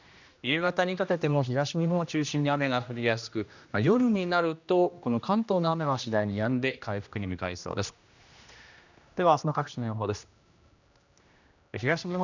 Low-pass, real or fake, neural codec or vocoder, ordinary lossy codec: 7.2 kHz; fake; codec, 16 kHz, 1 kbps, X-Codec, HuBERT features, trained on general audio; none